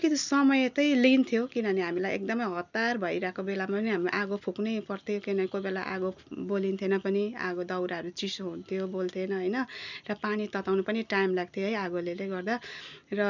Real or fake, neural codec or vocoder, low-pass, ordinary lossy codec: real; none; 7.2 kHz; none